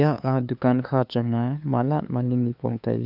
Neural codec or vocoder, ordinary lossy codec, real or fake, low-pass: codec, 16 kHz, 2 kbps, FunCodec, trained on LibriTTS, 25 frames a second; none; fake; 5.4 kHz